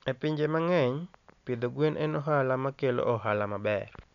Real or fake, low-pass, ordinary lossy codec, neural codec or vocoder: real; 7.2 kHz; none; none